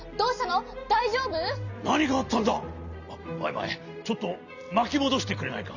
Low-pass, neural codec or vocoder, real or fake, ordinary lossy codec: 7.2 kHz; none; real; none